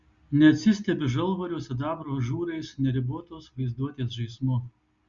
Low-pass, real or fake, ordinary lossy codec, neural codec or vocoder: 7.2 kHz; real; Opus, 64 kbps; none